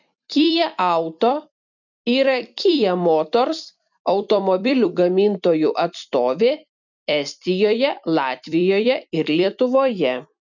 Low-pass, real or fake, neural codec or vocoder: 7.2 kHz; fake; vocoder, 44.1 kHz, 128 mel bands every 256 samples, BigVGAN v2